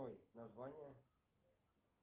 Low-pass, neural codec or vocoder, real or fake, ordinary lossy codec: 3.6 kHz; none; real; Opus, 16 kbps